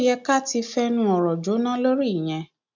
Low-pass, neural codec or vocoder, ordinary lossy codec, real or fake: 7.2 kHz; none; none; real